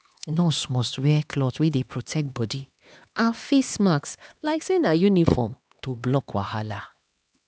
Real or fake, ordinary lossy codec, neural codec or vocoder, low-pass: fake; none; codec, 16 kHz, 2 kbps, X-Codec, HuBERT features, trained on LibriSpeech; none